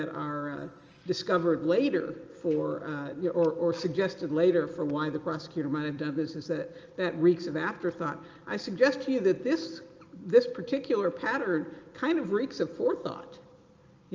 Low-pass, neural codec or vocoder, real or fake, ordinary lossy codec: 7.2 kHz; none; real; Opus, 24 kbps